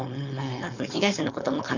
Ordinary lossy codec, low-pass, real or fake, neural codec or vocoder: none; 7.2 kHz; fake; codec, 16 kHz, 4.8 kbps, FACodec